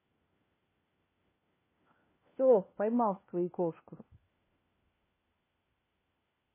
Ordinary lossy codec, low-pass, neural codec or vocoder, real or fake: MP3, 16 kbps; 3.6 kHz; codec, 16 kHz, 1 kbps, FunCodec, trained on LibriTTS, 50 frames a second; fake